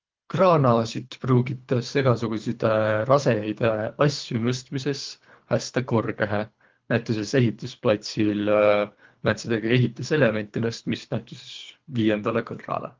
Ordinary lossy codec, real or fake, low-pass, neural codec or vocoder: Opus, 24 kbps; fake; 7.2 kHz; codec, 24 kHz, 3 kbps, HILCodec